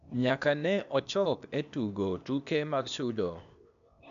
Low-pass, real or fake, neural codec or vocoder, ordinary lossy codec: 7.2 kHz; fake; codec, 16 kHz, 0.8 kbps, ZipCodec; none